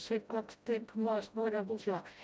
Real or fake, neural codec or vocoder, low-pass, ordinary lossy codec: fake; codec, 16 kHz, 0.5 kbps, FreqCodec, smaller model; none; none